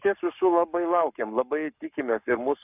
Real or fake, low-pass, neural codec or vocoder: fake; 3.6 kHz; codec, 44.1 kHz, 7.8 kbps, Pupu-Codec